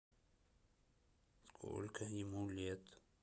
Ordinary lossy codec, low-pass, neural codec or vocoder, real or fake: none; none; none; real